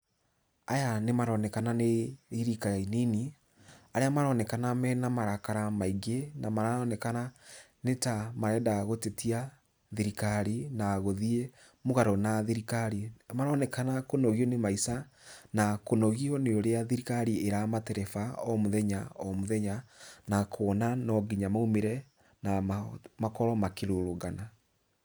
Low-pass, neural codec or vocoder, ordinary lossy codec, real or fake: none; none; none; real